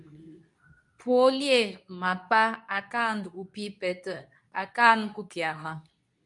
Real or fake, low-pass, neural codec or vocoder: fake; 10.8 kHz; codec, 24 kHz, 0.9 kbps, WavTokenizer, medium speech release version 2